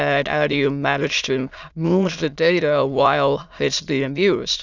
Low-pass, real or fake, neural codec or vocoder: 7.2 kHz; fake; autoencoder, 22.05 kHz, a latent of 192 numbers a frame, VITS, trained on many speakers